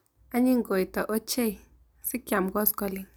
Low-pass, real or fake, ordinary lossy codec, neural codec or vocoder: none; real; none; none